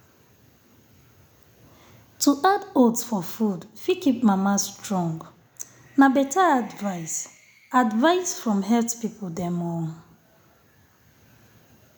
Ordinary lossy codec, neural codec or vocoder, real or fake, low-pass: none; none; real; none